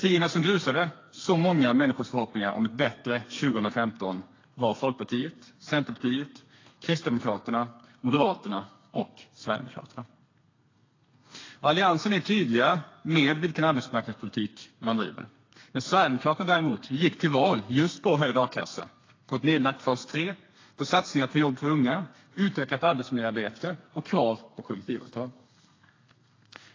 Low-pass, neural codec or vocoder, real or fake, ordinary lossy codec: 7.2 kHz; codec, 32 kHz, 1.9 kbps, SNAC; fake; AAC, 32 kbps